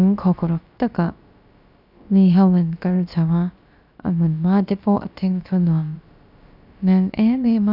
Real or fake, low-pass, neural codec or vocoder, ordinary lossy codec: fake; 5.4 kHz; codec, 16 kHz, about 1 kbps, DyCAST, with the encoder's durations; none